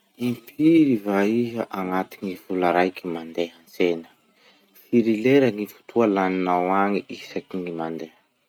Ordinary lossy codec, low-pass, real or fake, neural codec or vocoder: none; 19.8 kHz; real; none